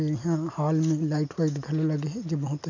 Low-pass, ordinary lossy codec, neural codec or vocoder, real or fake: 7.2 kHz; none; none; real